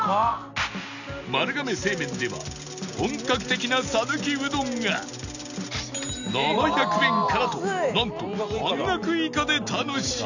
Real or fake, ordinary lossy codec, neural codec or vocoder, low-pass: real; none; none; 7.2 kHz